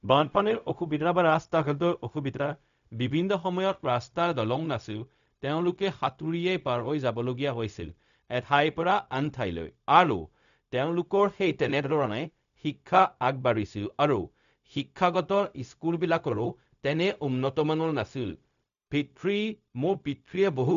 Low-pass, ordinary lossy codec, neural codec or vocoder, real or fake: 7.2 kHz; none; codec, 16 kHz, 0.4 kbps, LongCat-Audio-Codec; fake